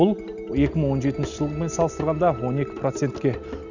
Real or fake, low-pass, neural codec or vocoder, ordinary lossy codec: real; 7.2 kHz; none; none